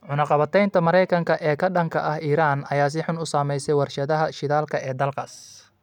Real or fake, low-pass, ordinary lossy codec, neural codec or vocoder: real; 19.8 kHz; none; none